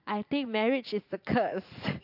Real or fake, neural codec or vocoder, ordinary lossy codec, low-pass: fake; vocoder, 44.1 kHz, 80 mel bands, Vocos; AAC, 48 kbps; 5.4 kHz